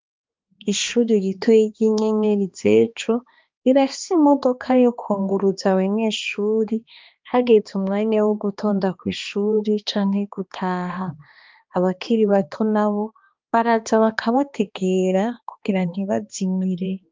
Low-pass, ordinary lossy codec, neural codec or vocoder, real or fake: 7.2 kHz; Opus, 32 kbps; codec, 16 kHz, 2 kbps, X-Codec, HuBERT features, trained on balanced general audio; fake